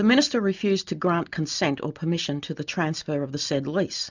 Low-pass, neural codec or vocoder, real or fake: 7.2 kHz; none; real